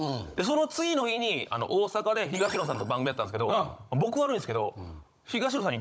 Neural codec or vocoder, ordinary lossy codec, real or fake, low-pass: codec, 16 kHz, 16 kbps, FunCodec, trained on Chinese and English, 50 frames a second; none; fake; none